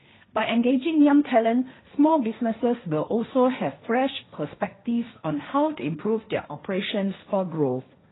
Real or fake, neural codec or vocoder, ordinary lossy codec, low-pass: fake; codec, 16 kHz, 1.1 kbps, Voila-Tokenizer; AAC, 16 kbps; 7.2 kHz